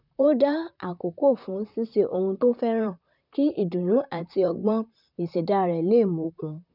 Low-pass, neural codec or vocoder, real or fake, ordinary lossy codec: 5.4 kHz; vocoder, 44.1 kHz, 128 mel bands, Pupu-Vocoder; fake; none